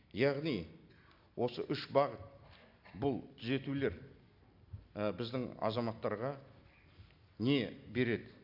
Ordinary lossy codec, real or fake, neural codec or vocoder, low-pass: AAC, 48 kbps; real; none; 5.4 kHz